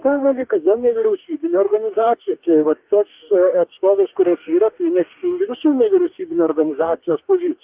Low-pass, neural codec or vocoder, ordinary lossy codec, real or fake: 3.6 kHz; codec, 44.1 kHz, 2.6 kbps, DAC; Opus, 32 kbps; fake